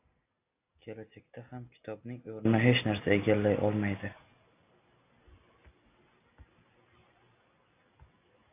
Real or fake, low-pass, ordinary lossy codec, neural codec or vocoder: real; 3.6 kHz; AAC, 24 kbps; none